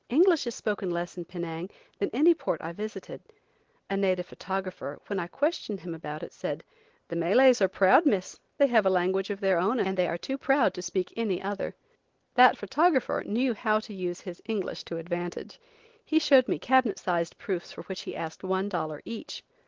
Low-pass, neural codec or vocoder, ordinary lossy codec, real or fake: 7.2 kHz; none; Opus, 16 kbps; real